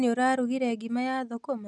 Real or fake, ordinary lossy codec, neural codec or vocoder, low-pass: real; none; none; 10.8 kHz